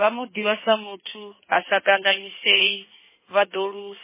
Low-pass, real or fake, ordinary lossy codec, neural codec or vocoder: 3.6 kHz; fake; MP3, 16 kbps; codec, 16 kHz in and 24 kHz out, 1.1 kbps, FireRedTTS-2 codec